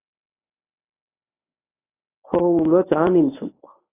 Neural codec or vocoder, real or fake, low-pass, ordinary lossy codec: codec, 24 kHz, 0.9 kbps, WavTokenizer, medium speech release version 1; fake; 3.6 kHz; AAC, 16 kbps